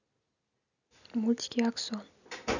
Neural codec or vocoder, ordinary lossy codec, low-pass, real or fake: none; none; 7.2 kHz; real